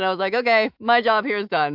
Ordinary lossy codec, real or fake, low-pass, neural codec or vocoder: AAC, 48 kbps; fake; 5.4 kHz; autoencoder, 48 kHz, 128 numbers a frame, DAC-VAE, trained on Japanese speech